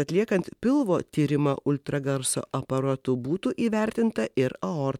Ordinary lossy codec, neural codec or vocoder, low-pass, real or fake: MP3, 96 kbps; none; 19.8 kHz; real